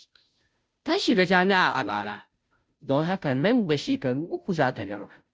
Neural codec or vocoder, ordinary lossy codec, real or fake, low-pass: codec, 16 kHz, 0.5 kbps, FunCodec, trained on Chinese and English, 25 frames a second; none; fake; none